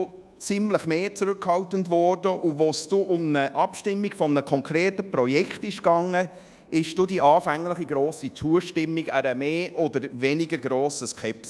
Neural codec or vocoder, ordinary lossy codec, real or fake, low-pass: codec, 24 kHz, 1.2 kbps, DualCodec; none; fake; none